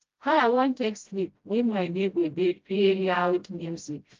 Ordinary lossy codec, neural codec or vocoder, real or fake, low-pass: Opus, 32 kbps; codec, 16 kHz, 0.5 kbps, FreqCodec, smaller model; fake; 7.2 kHz